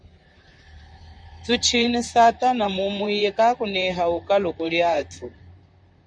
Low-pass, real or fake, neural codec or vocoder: 9.9 kHz; fake; vocoder, 44.1 kHz, 128 mel bands, Pupu-Vocoder